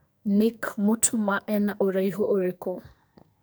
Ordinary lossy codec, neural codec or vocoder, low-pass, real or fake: none; codec, 44.1 kHz, 2.6 kbps, SNAC; none; fake